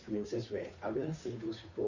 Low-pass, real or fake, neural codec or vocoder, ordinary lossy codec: 7.2 kHz; fake; codec, 16 kHz, 2 kbps, FunCodec, trained on Chinese and English, 25 frames a second; none